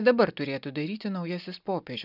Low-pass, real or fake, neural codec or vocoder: 5.4 kHz; real; none